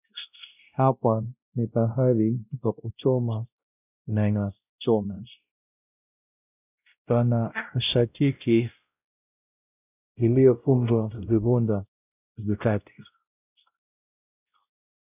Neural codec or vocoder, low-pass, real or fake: codec, 16 kHz, 0.5 kbps, X-Codec, WavLM features, trained on Multilingual LibriSpeech; 3.6 kHz; fake